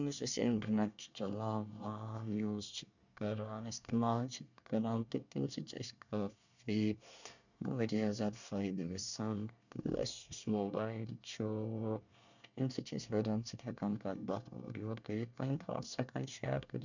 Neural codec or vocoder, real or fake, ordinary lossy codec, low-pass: codec, 24 kHz, 1 kbps, SNAC; fake; none; 7.2 kHz